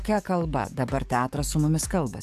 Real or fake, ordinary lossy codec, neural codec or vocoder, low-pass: fake; AAC, 96 kbps; codec, 44.1 kHz, 7.8 kbps, DAC; 14.4 kHz